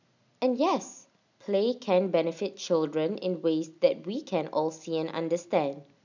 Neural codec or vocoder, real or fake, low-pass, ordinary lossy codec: none; real; 7.2 kHz; none